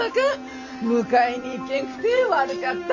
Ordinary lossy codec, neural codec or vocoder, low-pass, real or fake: none; none; 7.2 kHz; real